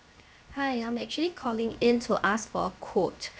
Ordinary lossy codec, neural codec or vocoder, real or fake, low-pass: none; codec, 16 kHz, 0.7 kbps, FocalCodec; fake; none